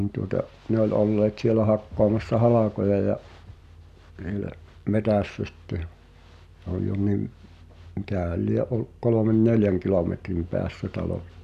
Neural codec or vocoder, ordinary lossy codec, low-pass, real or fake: none; none; 14.4 kHz; real